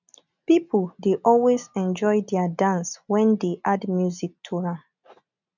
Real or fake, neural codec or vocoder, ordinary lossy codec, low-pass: real; none; none; 7.2 kHz